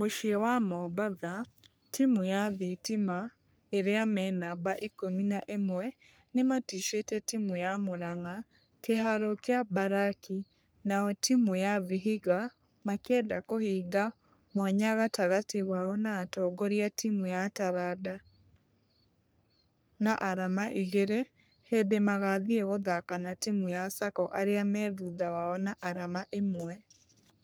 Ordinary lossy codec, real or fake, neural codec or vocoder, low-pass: none; fake; codec, 44.1 kHz, 3.4 kbps, Pupu-Codec; none